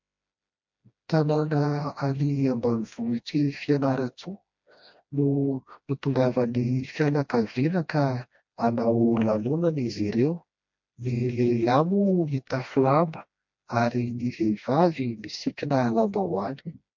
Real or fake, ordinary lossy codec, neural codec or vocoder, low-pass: fake; MP3, 48 kbps; codec, 16 kHz, 1 kbps, FreqCodec, smaller model; 7.2 kHz